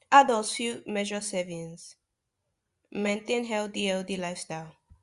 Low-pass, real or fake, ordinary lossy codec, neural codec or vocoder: 10.8 kHz; fake; Opus, 64 kbps; vocoder, 24 kHz, 100 mel bands, Vocos